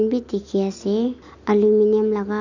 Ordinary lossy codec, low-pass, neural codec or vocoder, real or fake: none; 7.2 kHz; none; real